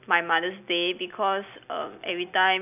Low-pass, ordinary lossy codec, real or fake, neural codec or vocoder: 3.6 kHz; none; real; none